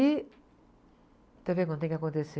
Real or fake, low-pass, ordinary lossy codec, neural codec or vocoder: real; none; none; none